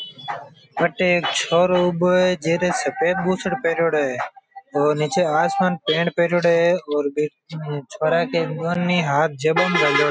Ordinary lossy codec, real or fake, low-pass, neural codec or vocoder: none; real; none; none